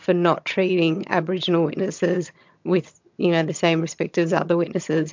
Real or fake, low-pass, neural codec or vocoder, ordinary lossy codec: fake; 7.2 kHz; vocoder, 22.05 kHz, 80 mel bands, HiFi-GAN; MP3, 64 kbps